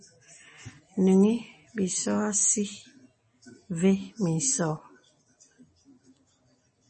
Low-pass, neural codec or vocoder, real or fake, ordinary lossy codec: 10.8 kHz; none; real; MP3, 32 kbps